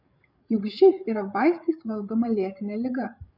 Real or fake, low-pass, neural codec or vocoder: fake; 5.4 kHz; codec, 16 kHz, 8 kbps, FreqCodec, larger model